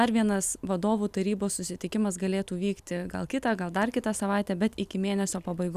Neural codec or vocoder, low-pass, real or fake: none; 14.4 kHz; real